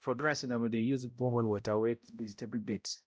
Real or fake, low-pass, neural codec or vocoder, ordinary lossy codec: fake; none; codec, 16 kHz, 0.5 kbps, X-Codec, HuBERT features, trained on balanced general audio; none